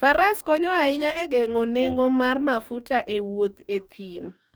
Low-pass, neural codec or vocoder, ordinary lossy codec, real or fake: none; codec, 44.1 kHz, 2.6 kbps, DAC; none; fake